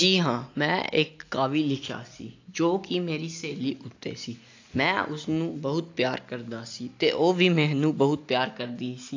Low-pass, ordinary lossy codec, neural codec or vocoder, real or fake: 7.2 kHz; AAC, 48 kbps; none; real